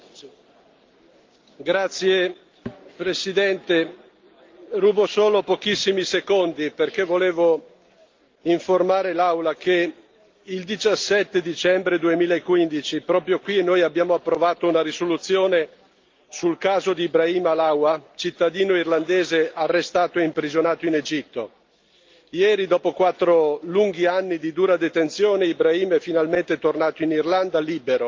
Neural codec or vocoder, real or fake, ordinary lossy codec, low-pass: none; real; Opus, 24 kbps; 7.2 kHz